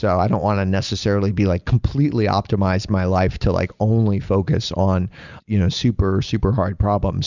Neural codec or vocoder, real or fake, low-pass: none; real; 7.2 kHz